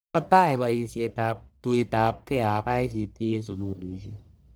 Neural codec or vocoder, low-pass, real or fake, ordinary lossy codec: codec, 44.1 kHz, 1.7 kbps, Pupu-Codec; none; fake; none